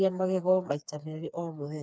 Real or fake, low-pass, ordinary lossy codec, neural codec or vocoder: fake; none; none; codec, 16 kHz, 4 kbps, FreqCodec, smaller model